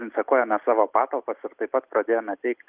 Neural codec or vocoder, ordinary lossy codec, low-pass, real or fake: none; Opus, 32 kbps; 3.6 kHz; real